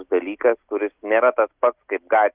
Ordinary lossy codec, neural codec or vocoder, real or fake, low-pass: Opus, 24 kbps; none; real; 3.6 kHz